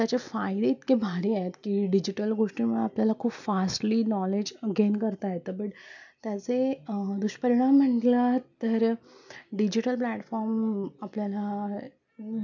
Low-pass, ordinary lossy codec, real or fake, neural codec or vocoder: 7.2 kHz; none; real; none